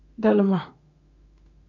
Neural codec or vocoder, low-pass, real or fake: autoencoder, 48 kHz, 32 numbers a frame, DAC-VAE, trained on Japanese speech; 7.2 kHz; fake